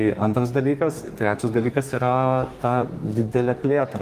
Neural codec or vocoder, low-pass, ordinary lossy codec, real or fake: codec, 32 kHz, 1.9 kbps, SNAC; 14.4 kHz; Opus, 24 kbps; fake